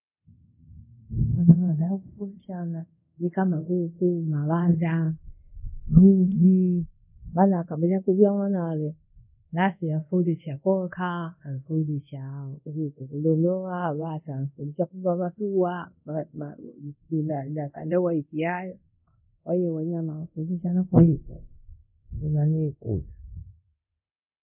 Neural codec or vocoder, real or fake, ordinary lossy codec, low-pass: codec, 24 kHz, 0.5 kbps, DualCodec; fake; none; 3.6 kHz